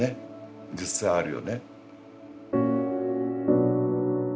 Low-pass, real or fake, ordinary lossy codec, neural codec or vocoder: none; real; none; none